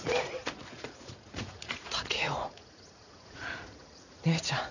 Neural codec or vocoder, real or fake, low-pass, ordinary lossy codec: vocoder, 44.1 kHz, 80 mel bands, Vocos; fake; 7.2 kHz; none